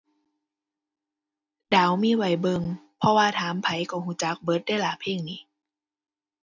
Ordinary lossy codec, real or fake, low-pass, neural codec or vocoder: none; real; 7.2 kHz; none